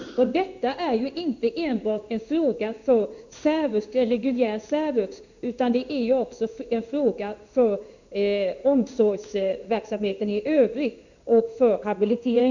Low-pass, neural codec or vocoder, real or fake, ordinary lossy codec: 7.2 kHz; codec, 16 kHz in and 24 kHz out, 1 kbps, XY-Tokenizer; fake; none